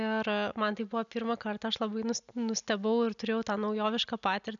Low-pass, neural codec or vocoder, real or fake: 7.2 kHz; none; real